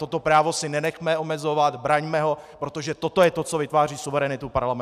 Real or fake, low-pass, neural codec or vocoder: real; 14.4 kHz; none